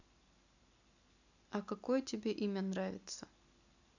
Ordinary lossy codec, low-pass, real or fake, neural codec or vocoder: none; 7.2 kHz; real; none